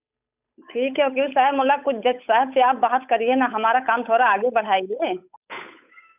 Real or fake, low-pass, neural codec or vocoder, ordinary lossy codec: fake; 3.6 kHz; codec, 16 kHz, 8 kbps, FunCodec, trained on Chinese and English, 25 frames a second; none